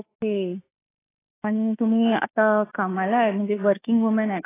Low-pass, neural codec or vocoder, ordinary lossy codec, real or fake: 3.6 kHz; autoencoder, 48 kHz, 32 numbers a frame, DAC-VAE, trained on Japanese speech; AAC, 16 kbps; fake